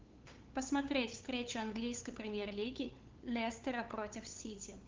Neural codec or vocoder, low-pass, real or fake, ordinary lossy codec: codec, 16 kHz, 8 kbps, FunCodec, trained on LibriTTS, 25 frames a second; 7.2 kHz; fake; Opus, 32 kbps